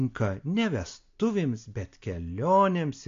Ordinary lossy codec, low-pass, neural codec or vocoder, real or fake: AAC, 48 kbps; 7.2 kHz; none; real